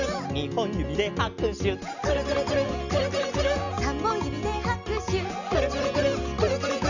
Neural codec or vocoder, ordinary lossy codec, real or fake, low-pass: none; none; real; 7.2 kHz